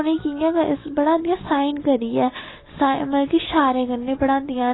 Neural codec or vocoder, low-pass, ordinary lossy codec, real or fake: none; 7.2 kHz; AAC, 16 kbps; real